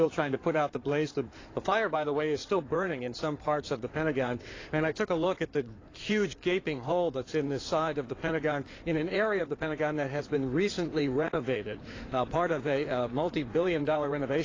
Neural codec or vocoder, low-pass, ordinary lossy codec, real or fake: codec, 16 kHz in and 24 kHz out, 2.2 kbps, FireRedTTS-2 codec; 7.2 kHz; AAC, 32 kbps; fake